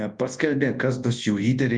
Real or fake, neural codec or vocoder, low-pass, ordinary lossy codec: fake; codec, 24 kHz, 0.9 kbps, WavTokenizer, large speech release; 9.9 kHz; Opus, 32 kbps